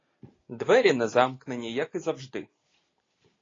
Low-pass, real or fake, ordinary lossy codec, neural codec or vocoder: 7.2 kHz; real; AAC, 32 kbps; none